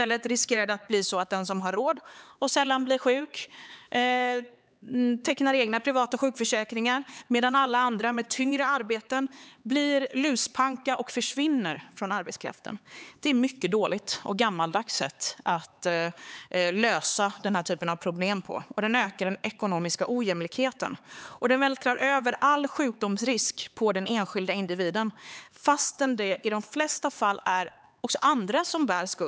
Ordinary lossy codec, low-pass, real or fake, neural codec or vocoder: none; none; fake; codec, 16 kHz, 4 kbps, X-Codec, HuBERT features, trained on LibriSpeech